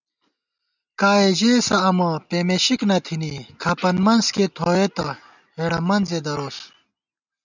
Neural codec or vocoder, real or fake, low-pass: none; real; 7.2 kHz